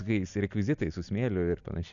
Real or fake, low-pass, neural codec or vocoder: real; 7.2 kHz; none